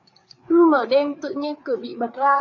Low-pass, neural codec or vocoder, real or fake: 7.2 kHz; codec, 16 kHz, 4 kbps, FreqCodec, larger model; fake